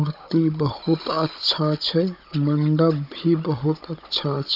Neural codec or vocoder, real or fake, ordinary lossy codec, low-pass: codec, 16 kHz, 16 kbps, FunCodec, trained on Chinese and English, 50 frames a second; fake; none; 5.4 kHz